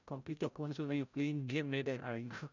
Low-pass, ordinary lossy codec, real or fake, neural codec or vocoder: 7.2 kHz; none; fake; codec, 16 kHz, 0.5 kbps, FreqCodec, larger model